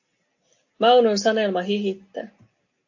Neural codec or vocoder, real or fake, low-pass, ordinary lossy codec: none; real; 7.2 kHz; AAC, 48 kbps